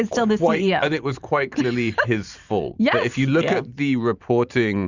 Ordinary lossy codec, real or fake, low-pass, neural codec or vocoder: Opus, 64 kbps; fake; 7.2 kHz; vocoder, 44.1 kHz, 128 mel bands every 512 samples, BigVGAN v2